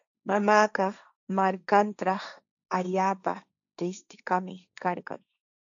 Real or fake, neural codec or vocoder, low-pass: fake; codec, 16 kHz, 1.1 kbps, Voila-Tokenizer; 7.2 kHz